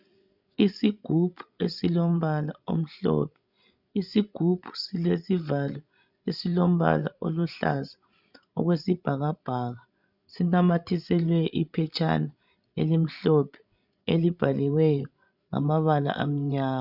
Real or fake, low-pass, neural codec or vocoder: fake; 5.4 kHz; codec, 16 kHz, 16 kbps, FreqCodec, larger model